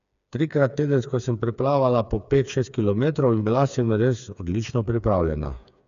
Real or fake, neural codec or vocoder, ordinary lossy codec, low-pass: fake; codec, 16 kHz, 4 kbps, FreqCodec, smaller model; none; 7.2 kHz